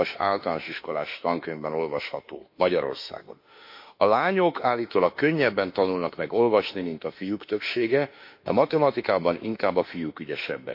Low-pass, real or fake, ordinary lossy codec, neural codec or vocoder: 5.4 kHz; fake; MP3, 32 kbps; autoencoder, 48 kHz, 32 numbers a frame, DAC-VAE, trained on Japanese speech